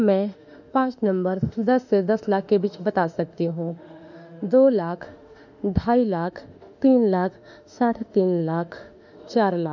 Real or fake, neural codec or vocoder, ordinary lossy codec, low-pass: fake; autoencoder, 48 kHz, 32 numbers a frame, DAC-VAE, trained on Japanese speech; none; 7.2 kHz